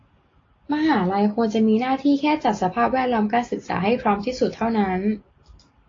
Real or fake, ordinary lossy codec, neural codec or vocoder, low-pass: real; AAC, 32 kbps; none; 7.2 kHz